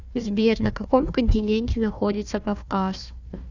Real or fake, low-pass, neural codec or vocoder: fake; 7.2 kHz; codec, 16 kHz, 1 kbps, FunCodec, trained on Chinese and English, 50 frames a second